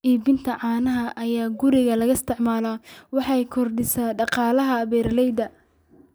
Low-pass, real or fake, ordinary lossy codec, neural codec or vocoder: none; real; none; none